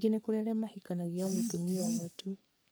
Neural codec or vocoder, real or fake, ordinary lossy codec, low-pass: codec, 44.1 kHz, 3.4 kbps, Pupu-Codec; fake; none; none